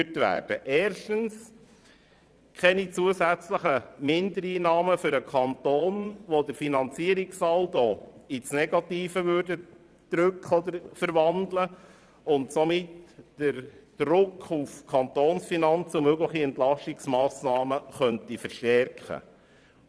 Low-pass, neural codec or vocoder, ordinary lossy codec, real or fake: none; vocoder, 22.05 kHz, 80 mel bands, Vocos; none; fake